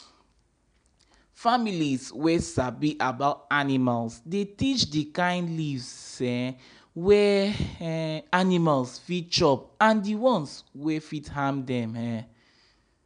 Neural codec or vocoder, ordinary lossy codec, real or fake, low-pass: none; none; real; 9.9 kHz